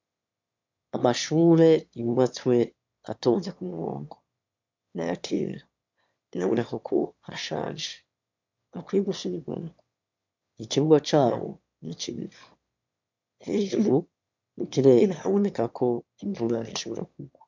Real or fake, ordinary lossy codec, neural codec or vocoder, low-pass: fake; MP3, 64 kbps; autoencoder, 22.05 kHz, a latent of 192 numbers a frame, VITS, trained on one speaker; 7.2 kHz